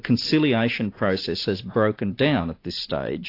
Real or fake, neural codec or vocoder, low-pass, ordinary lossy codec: real; none; 5.4 kHz; AAC, 32 kbps